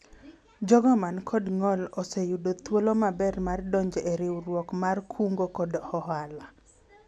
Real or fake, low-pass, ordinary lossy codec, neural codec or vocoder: real; none; none; none